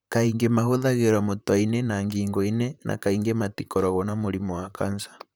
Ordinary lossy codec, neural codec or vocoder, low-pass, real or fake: none; vocoder, 44.1 kHz, 128 mel bands, Pupu-Vocoder; none; fake